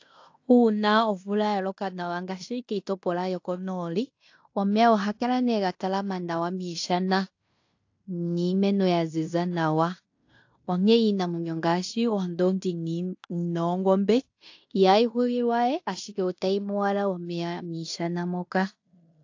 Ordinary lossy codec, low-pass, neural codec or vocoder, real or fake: AAC, 48 kbps; 7.2 kHz; codec, 16 kHz in and 24 kHz out, 0.9 kbps, LongCat-Audio-Codec, fine tuned four codebook decoder; fake